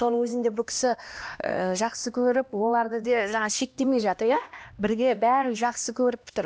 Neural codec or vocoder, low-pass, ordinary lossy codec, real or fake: codec, 16 kHz, 1 kbps, X-Codec, HuBERT features, trained on LibriSpeech; none; none; fake